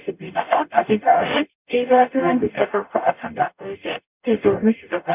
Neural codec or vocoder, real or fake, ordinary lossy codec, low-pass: codec, 44.1 kHz, 0.9 kbps, DAC; fake; none; 3.6 kHz